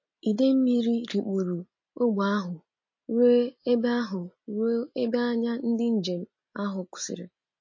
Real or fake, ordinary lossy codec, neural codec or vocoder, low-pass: real; MP3, 32 kbps; none; 7.2 kHz